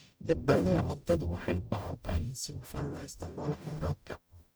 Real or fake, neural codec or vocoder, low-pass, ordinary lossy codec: fake; codec, 44.1 kHz, 0.9 kbps, DAC; none; none